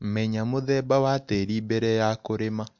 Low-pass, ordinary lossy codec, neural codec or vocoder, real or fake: 7.2 kHz; MP3, 64 kbps; none; real